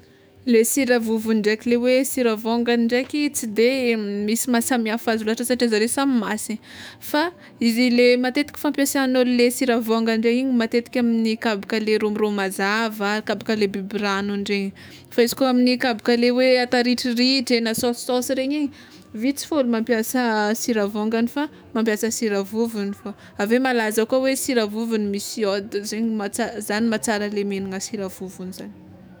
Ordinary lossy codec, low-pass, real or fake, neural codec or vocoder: none; none; fake; autoencoder, 48 kHz, 128 numbers a frame, DAC-VAE, trained on Japanese speech